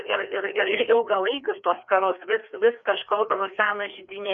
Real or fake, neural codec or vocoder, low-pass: fake; codec, 16 kHz, 2 kbps, FreqCodec, larger model; 7.2 kHz